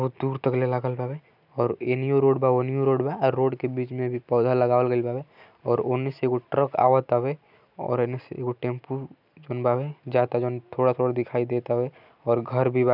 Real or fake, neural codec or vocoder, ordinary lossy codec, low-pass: real; none; none; 5.4 kHz